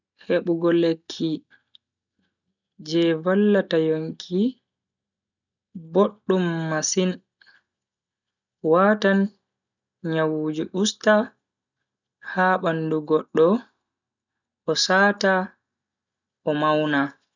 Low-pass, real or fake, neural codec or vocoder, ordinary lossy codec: 7.2 kHz; real; none; none